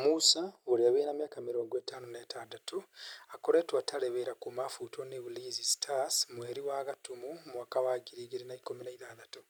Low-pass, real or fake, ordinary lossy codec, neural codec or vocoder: none; real; none; none